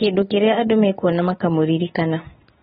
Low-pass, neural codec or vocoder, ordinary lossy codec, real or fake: 7.2 kHz; none; AAC, 16 kbps; real